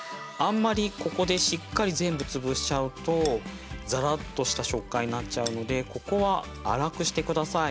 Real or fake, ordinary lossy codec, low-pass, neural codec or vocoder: real; none; none; none